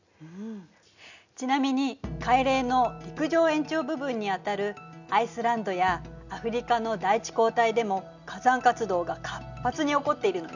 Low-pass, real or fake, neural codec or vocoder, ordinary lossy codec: 7.2 kHz; real; none; none